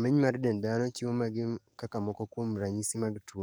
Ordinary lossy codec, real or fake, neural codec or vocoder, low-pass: none; fake; codec, 44.1 kHz, 7.8 kbps, DAC; none